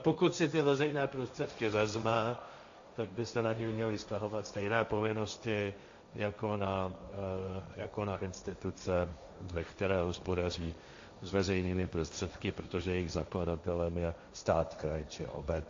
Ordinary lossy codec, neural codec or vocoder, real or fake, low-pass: AAC, 64 kbps; codec, 16 kHz, 1.1 kbps, Voila-Tokenizer; fake; 7.2 kHz